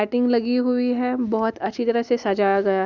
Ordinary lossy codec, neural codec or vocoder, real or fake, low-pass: Opus, 64 kbps; none; real; 7.2 kHz